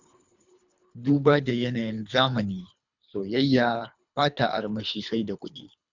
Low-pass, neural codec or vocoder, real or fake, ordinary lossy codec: 7.2 kHz; codec, 24 kHz, 3 kbps, HILCodec; fake; none